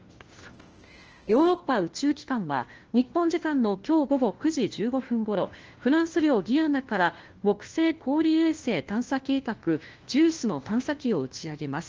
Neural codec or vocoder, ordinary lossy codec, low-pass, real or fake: codec, 16 kHz, 1 kbps, FunCodec, trained on LibriTTS, 50 frames a second; Opus, 16 kbps; 7.2 kHz; fake